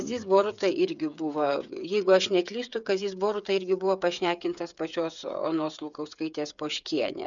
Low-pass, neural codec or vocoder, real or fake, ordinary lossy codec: 7.2 kHz; codec, 16 kHz, 8 kbps, FreqCodec, smaller model; fake; MP3, 96 kbps